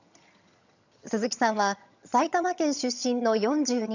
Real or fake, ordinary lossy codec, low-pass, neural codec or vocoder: fake; none; 7.2 kHz; vocoder, 22.05 kHz, 80 mel bands, HiFi-GAN